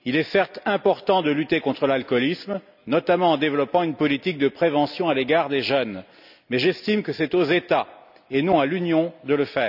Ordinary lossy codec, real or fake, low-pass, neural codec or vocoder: none; real; 5.4 kHz; none